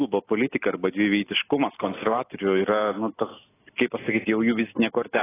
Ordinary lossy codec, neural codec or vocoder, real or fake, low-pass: AAC, 16 kbps; none; real; 3.6 kHz